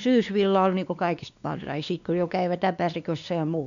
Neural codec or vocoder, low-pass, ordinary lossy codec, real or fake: codec, 16 kHz, 2 kbps, X-Codec, WavLM features, trained on Multilingual LibriSpeech; 7.2 kHz; none; fake